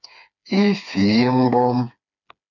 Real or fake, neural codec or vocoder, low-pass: fake; codec, 16 kHz, 4 kbps, FreqCodec, smaller model; 7.2 kHz